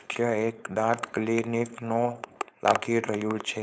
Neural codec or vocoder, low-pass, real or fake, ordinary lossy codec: codec, 16 kHz, 4.8 kbps, FACodec; none; fake; none